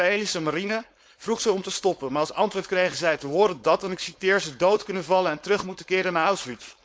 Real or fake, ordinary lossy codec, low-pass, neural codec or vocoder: fake; none; none; codec, 16 kHz, 4.8 kbps, FACodec